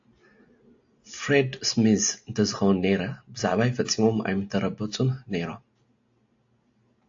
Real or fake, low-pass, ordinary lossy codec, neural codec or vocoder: real; 7.2 kHz; AAC, 48 kbps; none